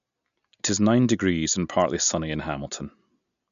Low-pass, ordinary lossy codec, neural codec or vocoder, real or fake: 7.2 kHz; none; none; real